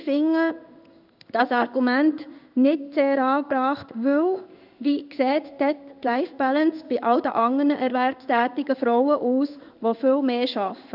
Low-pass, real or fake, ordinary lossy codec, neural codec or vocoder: 5.4 kHz; fake; none; codec, 16 kHz in and 24 kHz out, 1 kbps, XY-Tokenizer